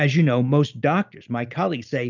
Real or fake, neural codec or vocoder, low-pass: real; none; 7.2 kHz